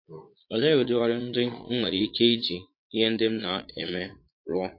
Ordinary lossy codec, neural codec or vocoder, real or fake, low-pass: MP3, 32 kbps; vocoder, 22.05 kHz, 80 mel bands, Vocos; fake; 5.4 kHz